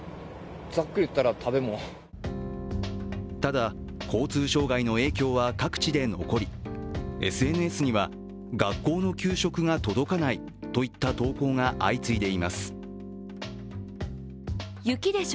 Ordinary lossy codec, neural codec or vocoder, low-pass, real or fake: none; none; none; real